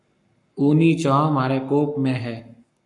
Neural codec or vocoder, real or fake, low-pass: codec, 44.1 kHz, 7.8 kbps, Pupu-Codec; fake; 10.8 kHz